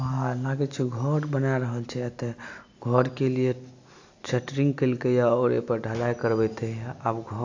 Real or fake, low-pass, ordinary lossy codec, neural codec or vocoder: real; 7.2 kHz; AAC, 48 kbps; none